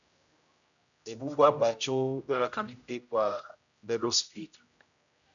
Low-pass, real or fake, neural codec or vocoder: 7.2 kHz; fake; codec, 16 kHz, 0.5 kbps, X-Codec, HuBERT features, trained on general audio